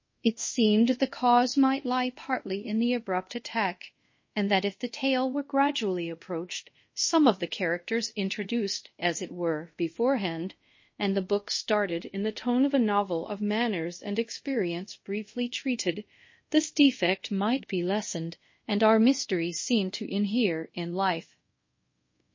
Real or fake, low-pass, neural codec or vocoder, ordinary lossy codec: fake; 7.2 kHz; codec, 24 kHz, 0.5 kbps, DualCodec; MP3, 32 kbps